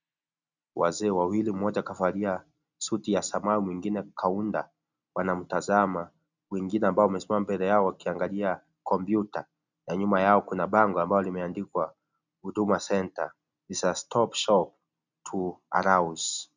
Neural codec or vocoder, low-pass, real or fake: none; 7.2 kHz; real